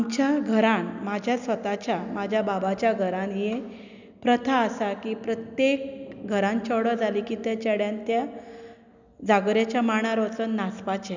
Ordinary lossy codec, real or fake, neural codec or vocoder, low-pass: none; real; none; 7.2 kHz